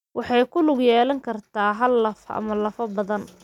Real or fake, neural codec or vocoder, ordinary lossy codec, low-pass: real; none; none; 19.8 kHz